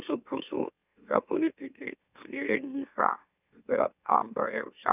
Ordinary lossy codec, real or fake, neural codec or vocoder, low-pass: none; fake; autoencoder, 44.1 kHz, a latent of 192 numbers a frame, MeloTTS; 3.6 kHz